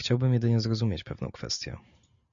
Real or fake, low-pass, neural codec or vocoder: real; 7.2 kHz; none